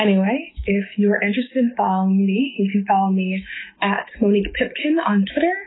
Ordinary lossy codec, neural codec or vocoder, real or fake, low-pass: AAC, 16 kbps; autoencoder, 48 kHz, 128 numbers a frame, DAC-VAE, trained on Japanese speech; fake; 7.2 kHz